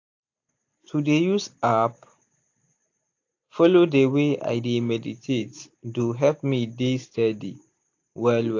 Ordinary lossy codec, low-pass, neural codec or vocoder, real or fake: AAC, 48 kbps; 7.2 kHz; none; real